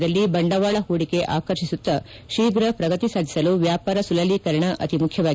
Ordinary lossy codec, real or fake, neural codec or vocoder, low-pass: none; real; none; none